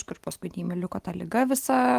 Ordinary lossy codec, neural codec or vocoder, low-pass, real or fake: Opus, 24 kbps; none; 14.4 kHz; real